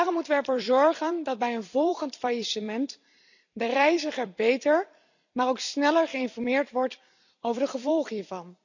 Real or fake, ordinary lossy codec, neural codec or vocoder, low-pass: fake; none; vocoder, 44.1 kHz, 128 mel bands every 256 samples, BigVGAN v2; 7.2 kHz